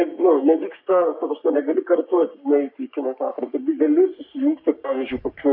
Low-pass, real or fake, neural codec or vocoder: 5.4 kHz; fake; codec, 44.1 kHz, 3.4 kbps, Pupu-Codec